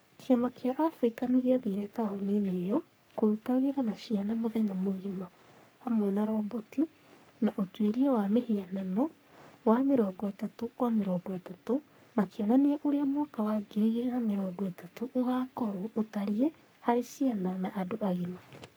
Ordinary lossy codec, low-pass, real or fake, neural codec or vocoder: none; none; fake; codec, 44.1 kHz, 3.4 kbps, Pupu-Codec